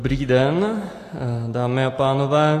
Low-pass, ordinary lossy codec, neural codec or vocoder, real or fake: 14.4 kHz; AAC, 48 kbps; none; real